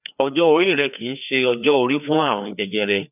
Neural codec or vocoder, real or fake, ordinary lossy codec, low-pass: codec, 16 kHz, 2 kbps, FreqCodec, larger model; fake; none; 3.6 kHz